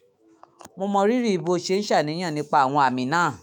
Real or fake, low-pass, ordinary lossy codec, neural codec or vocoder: fake; 19.8 kHz; none; autoencoder, 48 kHz, 128 numbers a frame, DAC-VAE, trained on Japanese speech